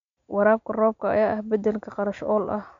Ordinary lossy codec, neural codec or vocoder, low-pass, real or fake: MP3, 64 kbps; none; 7.2 kHz; real